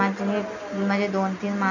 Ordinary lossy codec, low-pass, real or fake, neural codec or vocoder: none; 7.2 kHz; real; none